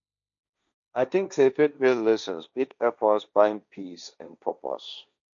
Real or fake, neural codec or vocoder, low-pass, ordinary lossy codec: fake; codec, 16 kHz, 1.1 kbps, Voila-Tokenizer; 7.2 kHz; none